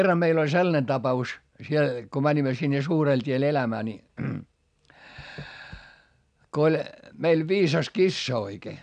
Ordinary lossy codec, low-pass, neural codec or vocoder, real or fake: none; 9.9 kHz; none; real